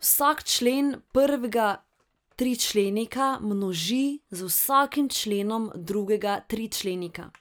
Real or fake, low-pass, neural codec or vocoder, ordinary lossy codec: real; none; none; none